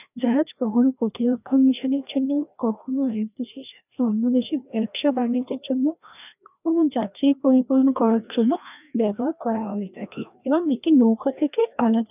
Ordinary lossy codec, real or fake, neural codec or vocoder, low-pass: none; fake; codec, 16 kHz, 1 kbps, FreqCodec, larger model; 3.6 kHz